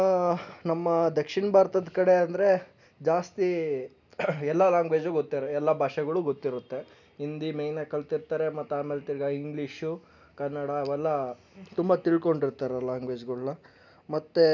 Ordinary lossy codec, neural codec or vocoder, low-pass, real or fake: none; none; 7.2 kHz; real